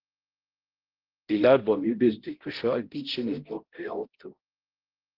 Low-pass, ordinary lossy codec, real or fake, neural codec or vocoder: 5.4 kHz; Opus, 16 kbps; fake; codec, 16 kHz, 0.5 kbps, X-Codec, HuBERT features, trained on balanced general audio